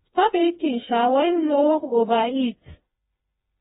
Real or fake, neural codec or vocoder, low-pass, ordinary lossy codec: fake; codec, 16 kHz, 2 kbps, FreqCodec, smaller model; 7.2 kHz; AAC, 16 kbps